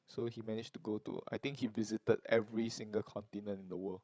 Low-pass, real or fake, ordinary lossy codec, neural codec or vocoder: none; fake; none; codec, 16 kHz, 16 kbps, FreqCodec, larger model